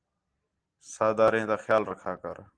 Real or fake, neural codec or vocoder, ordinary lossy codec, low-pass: real; none; Opus, 24 kbps; 9.9 kHz